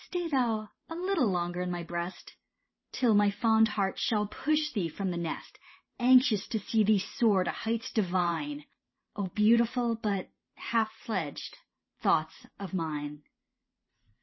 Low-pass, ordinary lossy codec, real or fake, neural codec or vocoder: 7.2 kHz; MP3, 24 kbps; fake; vocoder, 44.1 kHz, 128 mel bands every 512 samples, BigVGAN v2